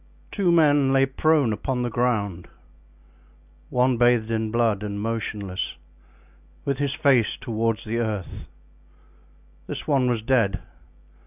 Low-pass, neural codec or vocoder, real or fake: 3.6 kHz; none; real